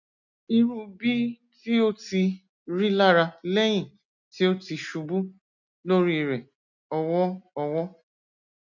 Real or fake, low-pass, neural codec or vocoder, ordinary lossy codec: real; 7.2 kHz; none; none